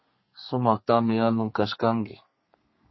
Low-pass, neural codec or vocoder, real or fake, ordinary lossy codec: 7.2 kHz; codec, 32 kHz, 1.9 kbps, SNAC; fake; MP3, 24 kbps